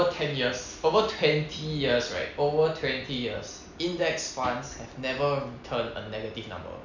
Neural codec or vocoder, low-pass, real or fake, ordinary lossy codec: none; 7.2 kHz; real; none